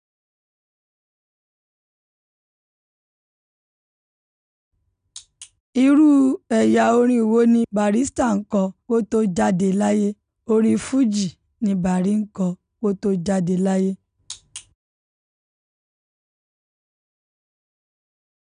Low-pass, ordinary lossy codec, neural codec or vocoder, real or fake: 9.9 kHz; none; none; real